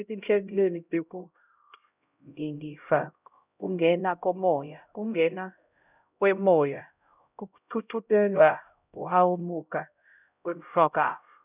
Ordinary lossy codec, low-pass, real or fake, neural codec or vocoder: none; 3.6 kHz; fake; codec, 16 kHz, 0.5 kbps, X-Codec, HuBERT features, trained on LibriSpeech